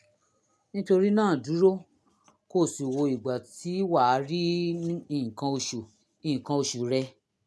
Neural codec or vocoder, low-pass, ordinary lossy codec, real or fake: none; none; none; real